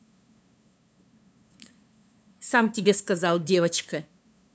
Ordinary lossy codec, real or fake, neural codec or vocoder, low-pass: none; fake; codec, 16 kHz, 2 kbps, FunCodec, trained on LibriTTS, 25 frames a second; none